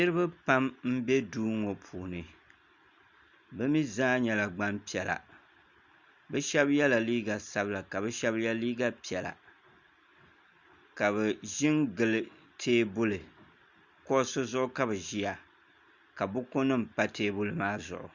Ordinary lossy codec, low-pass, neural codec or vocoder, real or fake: Opus, 64 kbps; 7.2 kHz; none; real